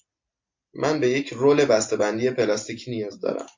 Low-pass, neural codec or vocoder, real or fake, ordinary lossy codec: 7.2 kHz; none; real; MP3, 48 kbps